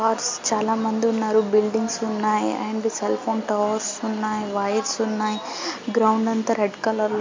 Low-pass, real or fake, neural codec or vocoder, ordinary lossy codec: 7.2 kHz; real; none; MP3, 48 kbps